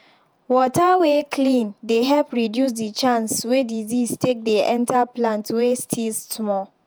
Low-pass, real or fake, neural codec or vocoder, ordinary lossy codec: none; fake; vocoder, 48 kHz, 128 mel bands, Vocos; none